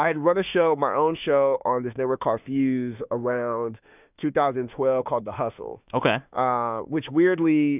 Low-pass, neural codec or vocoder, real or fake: 3.6 kHz; autoencoder, 48 kHz, 32 numbers a frame, DAC-VAE, trained on Japanese speech; fake